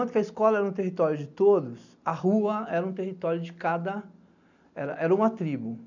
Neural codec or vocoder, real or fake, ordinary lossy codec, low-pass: none; real; none; 7.2 kHz